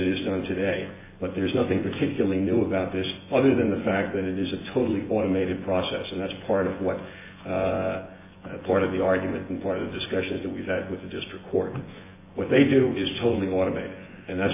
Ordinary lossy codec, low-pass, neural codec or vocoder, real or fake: MP3, 16 kbps; 3.6 kHz; vocoder, 24 kHz, 100 mel bands, Vocos; fake